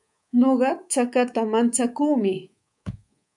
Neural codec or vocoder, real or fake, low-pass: codec, 24 kHz, 3.1 kbps, DualCodec; fake; 10.8 kHz